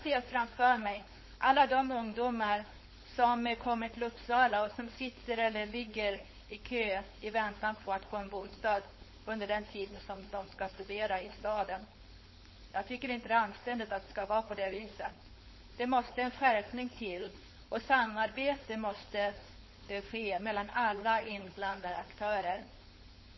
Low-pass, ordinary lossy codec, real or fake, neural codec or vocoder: 7.2 kHz; MP3, 24 kbps; fake; codec, 16 kHz, 4.8 kbps, FACodec